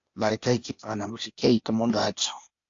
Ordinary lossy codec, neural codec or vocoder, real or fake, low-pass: AAC, 48 kbps; codec, 16 kHz, 0.8 kbps, ZipCodec; fake; 7.2 kHz